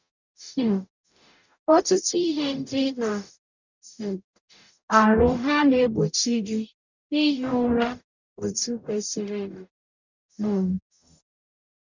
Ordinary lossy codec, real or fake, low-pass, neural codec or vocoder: none; fake; 7.2 kHz; codec, 44.1 kHz, 0.9 kbps, DAC